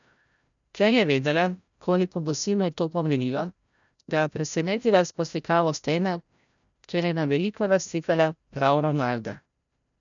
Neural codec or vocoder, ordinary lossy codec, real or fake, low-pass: codec, 16 kHz, 0.5 kbps, FreqCodec, larger model; none; fake; 7.2 kHz